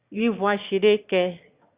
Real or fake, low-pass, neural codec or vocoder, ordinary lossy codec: fake; 3.6 kHz; autoencoder, 22.05 kHz, a latent of 192 numbers a frame, VITS, trained on one speaker; Opus, 64 kbps